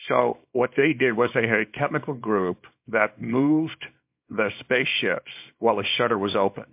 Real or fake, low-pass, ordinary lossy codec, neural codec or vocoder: fake; 3.6 kHz; MP3, 32 kbps; codec, 24 kHz, 0.9 kbps, WavTokenizer, small release